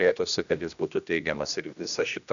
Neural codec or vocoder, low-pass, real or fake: codec, 16 kHz, 1 kbps, X-Codec, HuBERT features, trained on general audio; 7.2 kHz; fake